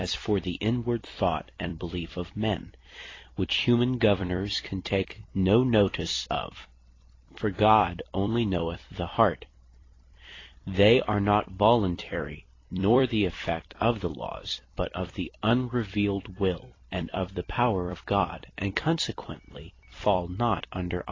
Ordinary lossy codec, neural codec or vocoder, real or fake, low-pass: AAC, 32 kbps; vocoder, 44.1 kHz, 128 mel bands every 256 samples, BigVGAN v2; fake; 7.2 kHz